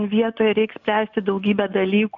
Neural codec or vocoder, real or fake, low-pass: none; real; 7.2 kHz